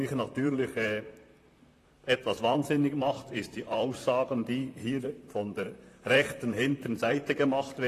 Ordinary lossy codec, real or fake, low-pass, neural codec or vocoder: AAC, 48 kbps; fake; 14.4 kHz; vocoder, 44.1 kHz, 128 mel bands, Pupu-Vocoder